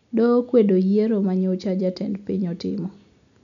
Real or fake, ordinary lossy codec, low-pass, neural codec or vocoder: real; none; 7.2 kHz; none